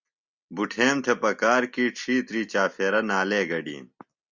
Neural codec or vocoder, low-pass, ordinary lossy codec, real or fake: none; 7.2 kHz; Opus, 64 kbps; real